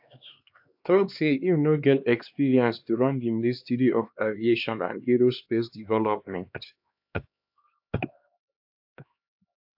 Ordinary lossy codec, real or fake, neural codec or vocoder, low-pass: AAC, 48 kbps; fake; codec, 16 kHz, 2 kbps, X-Codec, HuBERT features, trained on LibriSpeech; 5.4 kHz